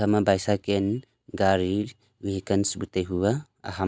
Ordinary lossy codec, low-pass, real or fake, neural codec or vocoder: none; none; real; none